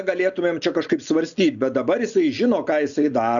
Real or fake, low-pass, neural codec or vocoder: real; 7.2 kHz; none